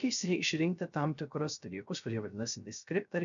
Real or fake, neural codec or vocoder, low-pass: fake; codec, 16 kHz, 0.3 kbps, FocalCodec; 7.2 kHz